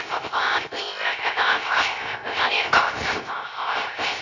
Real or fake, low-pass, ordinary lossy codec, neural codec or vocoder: fake; 7.2 kHz; none; codec, 16 kHz, 0.3 kbps, FocalCodec